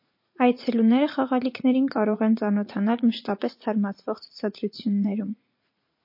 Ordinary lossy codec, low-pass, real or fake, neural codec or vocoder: MP3, 32 kbps; 5.4 kHz; fake; vocoder, 44.1 kHz, 80 mel bands, Vocos